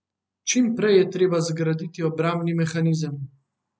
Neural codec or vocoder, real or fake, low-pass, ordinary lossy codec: none; real; none; none